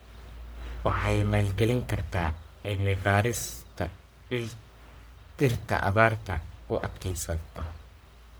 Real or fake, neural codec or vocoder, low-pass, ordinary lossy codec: fake; codec, 44.1 kHz, 1.7 kbps, Pupu-Codec; none; none